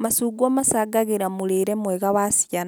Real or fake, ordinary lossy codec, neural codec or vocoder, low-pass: real; none; none; none